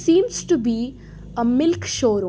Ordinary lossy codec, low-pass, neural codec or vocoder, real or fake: none; none; none; real